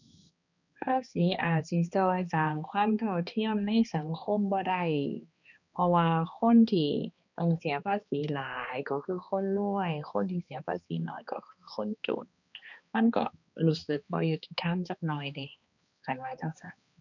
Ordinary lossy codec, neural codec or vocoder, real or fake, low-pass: none; codec, 16 kHz, 2 kbps, X-Codec, HuBERT features, trained on balanced general audio; fake; 7.2 kHz